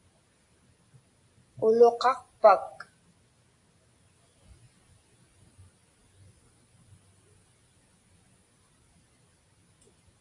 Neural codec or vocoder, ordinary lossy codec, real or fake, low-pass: none; AAC, 48 kbps; real; 10.8 kHz